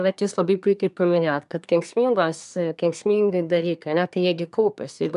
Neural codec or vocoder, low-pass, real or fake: codec, 24 kHz, 1 kbps, SNAC; 10.8 kHz; fake